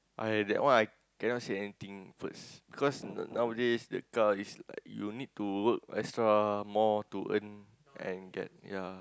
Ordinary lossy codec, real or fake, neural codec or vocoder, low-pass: none; real; none; none